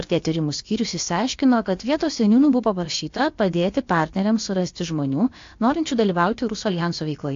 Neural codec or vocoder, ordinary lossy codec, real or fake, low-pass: codec, 16 kHz, about 1 kbps, DyCAST, with the encoder's durations; AAC, 48 kbps; fake; 7.2 kHz